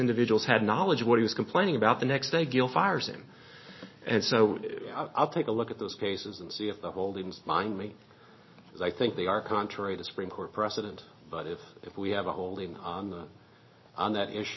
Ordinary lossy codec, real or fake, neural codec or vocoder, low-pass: MP3, 24 kbps; real; none; 7.2 kHz